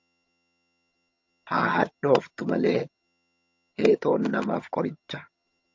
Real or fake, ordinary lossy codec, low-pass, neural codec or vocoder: fake; MP3, 48 kbps; 7.2 kHz; vocoder, 22.05 kHz, 80 mel bands, HiFi-GAN